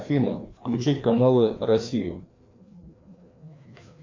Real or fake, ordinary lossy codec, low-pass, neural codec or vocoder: fake; MP3, 48 kbps; 7.2 kHz; codec, 16 kHz, 2 kbps, FreqCodec, larger model